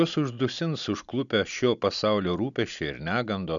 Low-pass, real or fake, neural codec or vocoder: 7.2 kHz; real; none